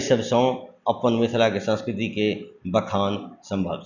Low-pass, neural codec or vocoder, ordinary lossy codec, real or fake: 7.2 kHz; none; none; real